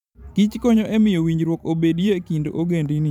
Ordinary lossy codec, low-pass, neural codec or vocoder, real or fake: none; 19.8 kHz; vocoder, 44.1 kHz, 128 mel bands every 512 samples, BigVGAN v2; fake